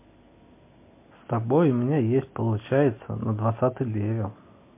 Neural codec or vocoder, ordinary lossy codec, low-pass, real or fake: none; MP3, 24 kbps; 3.6 kHz; real